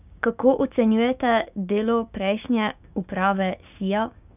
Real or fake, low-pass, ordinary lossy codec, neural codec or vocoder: fake; 3.6 kHz; none; codec, 16 kHz, 6 kbps, DAC